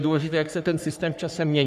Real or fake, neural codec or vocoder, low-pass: fake; codec, 44.1 kHz, 3.4 kbps, Pupu-Codec; 14.4 kHz